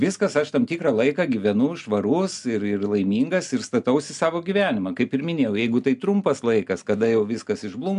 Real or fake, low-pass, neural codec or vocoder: real; 10.8 kHz; none